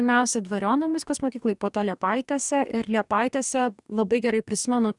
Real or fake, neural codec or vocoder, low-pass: fake; codec, 44.1 kHz, 2.6 kbps, DAC; 10.8 kHz